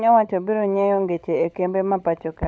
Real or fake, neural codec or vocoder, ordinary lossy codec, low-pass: fake; codec, 16 kHz, 16 kbps, FunCodec, trained on LibriTTS, 50 frames a second; none; none